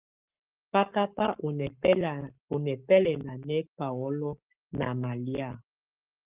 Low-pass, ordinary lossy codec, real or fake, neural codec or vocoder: 3.6 kHz; Opus, 24 kbps; fake; codec, 44.1 kHz, 7.8 kbps, Pupu-Codec